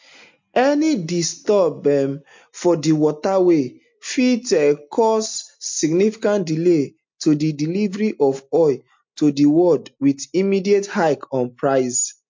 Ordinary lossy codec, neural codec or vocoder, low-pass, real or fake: MP3, 48 kbps; none; 7.2 kHz; real